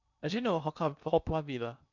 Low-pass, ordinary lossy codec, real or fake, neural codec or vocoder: 7.2 kHz; none; fake; codec, 16 kHz in and 24 kHz out, 0.8 kbps, FocalCodec, streaming, 65536 codes